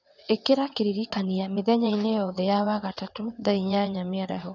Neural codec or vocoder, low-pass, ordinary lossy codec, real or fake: vocoder, 22.05 kHz, 80 mel bands, WaveNeXt; 7.2 kHz; none; fake